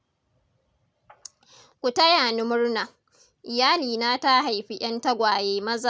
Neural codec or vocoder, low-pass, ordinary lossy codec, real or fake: none; none; none; real